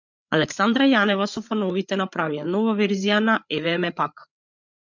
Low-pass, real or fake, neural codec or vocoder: 7.2 kHz; fake; codec, 16 kHz, 8 kbps, FreqCodec, larger model